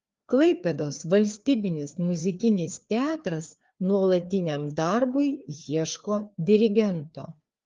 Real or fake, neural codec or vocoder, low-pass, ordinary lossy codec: fake; codec, 16 kHz, 2 kbps, FreqCodec, larger model; 7.2 kHz; Opus, 32 kbps